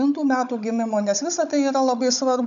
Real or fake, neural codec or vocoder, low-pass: fake; codec, 16 kHz, 16 kbps, FunCodec, trained on Chinese and English, 50 frames a second; 7.2 kHz